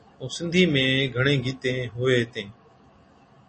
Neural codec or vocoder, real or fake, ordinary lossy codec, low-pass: none; real; MP3, 32 kbps; 10.8 kHz